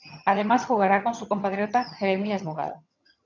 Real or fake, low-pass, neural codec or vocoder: fake; 7.2 kHz; vocoder, 22.05 kHz, 80 mel bands, HiFi-GAN